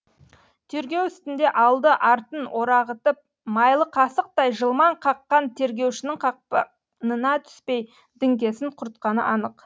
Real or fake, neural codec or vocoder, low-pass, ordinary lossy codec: real; none; none; none